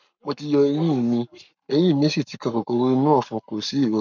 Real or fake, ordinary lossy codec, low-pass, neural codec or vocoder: fake; none; 7.2 kHz; codec, 44.1 kHz, 7.8 kbps, Pupu-Codec